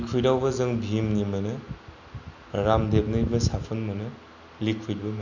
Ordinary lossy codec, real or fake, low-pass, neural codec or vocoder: none; real; 7.2 kHz; none